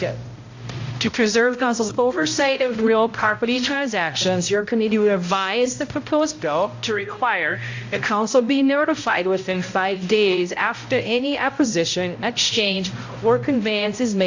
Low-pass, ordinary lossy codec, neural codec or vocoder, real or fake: 7.2 kHz; AAC, 48 kbps; codec, 16 kHz, 0.5 kbps, X-Codec, HuBERT features, trained on balanced general audio; fake